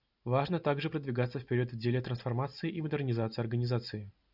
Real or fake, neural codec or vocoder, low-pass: real; none; 5.4 kHz